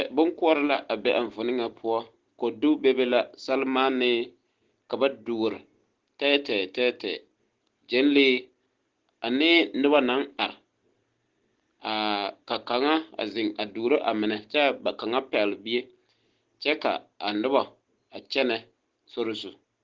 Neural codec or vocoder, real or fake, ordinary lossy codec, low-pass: none; real; Opus, 16 kbps; 7.2 kHz